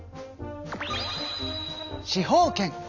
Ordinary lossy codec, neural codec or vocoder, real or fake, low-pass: none; none; real; 7.2 kHz